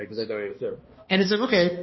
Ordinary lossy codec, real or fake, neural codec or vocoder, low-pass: MP3, 24 kbps; fake; codec, 16 kHz, 1 kbps, X-Codec, HuBERT features, trained on balanced general audio; 7.2 kHz